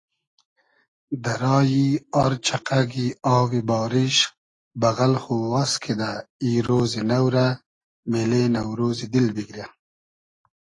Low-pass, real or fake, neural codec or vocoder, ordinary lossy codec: 10.8 kHz; real; none; AAC, 32 kbps